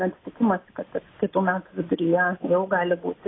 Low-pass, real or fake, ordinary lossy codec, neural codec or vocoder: 7.2 kHz; real; AAC, 16 kbps; none